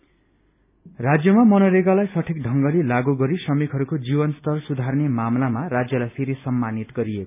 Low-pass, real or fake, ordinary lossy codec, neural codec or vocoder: 3.6 kHz; real; none; none